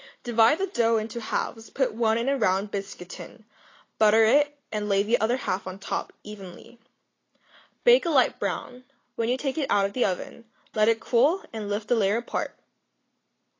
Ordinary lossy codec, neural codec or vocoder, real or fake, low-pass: AAC, 32 kbps; none; real; 7.2 kHz